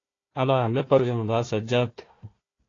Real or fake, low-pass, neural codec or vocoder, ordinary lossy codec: fake; 7.2 kHz; codec, 16 kHz, 1 kbps, FunCodec, trained on Chinese and English, 50 frames a second; AAC, 32 kbps